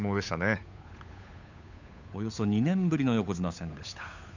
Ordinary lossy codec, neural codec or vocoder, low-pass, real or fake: none; codec, 16 kHz, 8 kbps, FunCodec, trained on Chinese and English, 25 frames a second; 7.2 kHz; fake